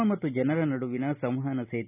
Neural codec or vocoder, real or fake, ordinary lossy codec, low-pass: none; real; none; 3.6 kHz